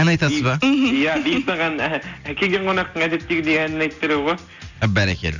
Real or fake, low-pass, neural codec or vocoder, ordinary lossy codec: real; 7.2 kHz; none; none